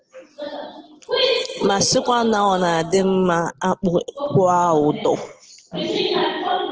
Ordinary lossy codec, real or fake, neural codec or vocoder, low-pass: Opus, 16 kbps; real; none; 7.2 kHz